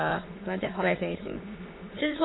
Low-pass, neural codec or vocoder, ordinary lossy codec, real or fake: 7.2 kHz; autoencoder, 22.05 kHz, a latent of 192 numbers a frame, VITS, trained on many speakers; AAC, 16 kbps; fake